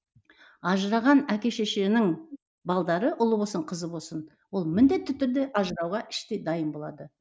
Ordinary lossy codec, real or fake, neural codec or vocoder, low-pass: none; real; none; none